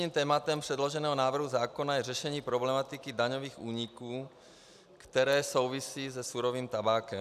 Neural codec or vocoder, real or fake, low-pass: none; real; 14.4 kHz